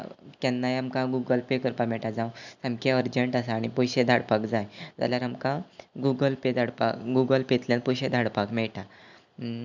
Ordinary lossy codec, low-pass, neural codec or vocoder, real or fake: none; 7.2 kHz; none; real